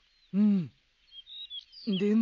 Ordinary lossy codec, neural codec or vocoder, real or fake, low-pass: none; none; real; 7.2 kHz